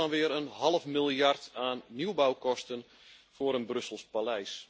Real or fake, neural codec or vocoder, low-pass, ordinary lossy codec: real; none; none; none